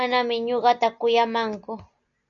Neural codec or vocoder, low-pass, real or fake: none; 7.2 kHz; real